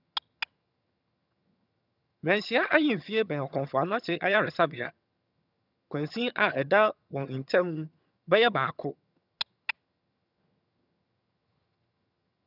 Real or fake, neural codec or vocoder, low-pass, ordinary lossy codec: fake; vocoder, 22.05 kHz, 80 mel bands, HiFi-GAN; 5.4 kHz; none